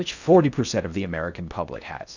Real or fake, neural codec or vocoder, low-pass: fake; codec, 16 kHz in and 24 kHz out, 0.6 kbps, FocalCodec, streaming, 4096 codes; 7.2 kHz